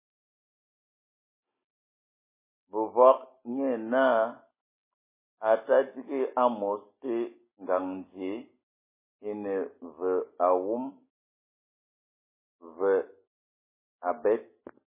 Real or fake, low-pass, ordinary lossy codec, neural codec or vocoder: real; 3.6 kHz; MP3, 16 kbps; none